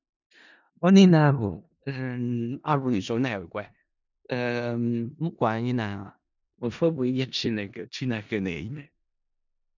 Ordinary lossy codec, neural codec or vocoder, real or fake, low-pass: none; codec, 16 kHz in and 24 kHz out, 0.4 kbps, LongCat-Audio-Codec, four codebook decoder; fake; 7.2 kHz